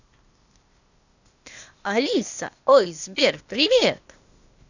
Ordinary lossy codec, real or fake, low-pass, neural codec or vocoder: none; fake; 7.2 kHz; codec, 16 kHz, 0.8 kbps, ZipCodec